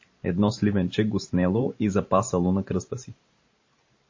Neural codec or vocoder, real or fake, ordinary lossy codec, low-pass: vocoder, 44.1 kHz, 128 mel bands every 512 samples, BigVGAN v2; fake; MP3, 32 kbps; 7.2 kHz